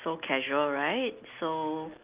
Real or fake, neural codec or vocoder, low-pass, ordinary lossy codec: real; none; 3.6 kHz; Opus, 24 kbps